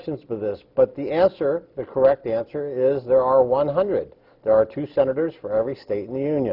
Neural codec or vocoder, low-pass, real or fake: none; 5.4 kHz; real